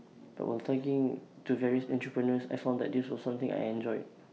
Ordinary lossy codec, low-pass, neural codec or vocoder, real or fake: none; none; none; real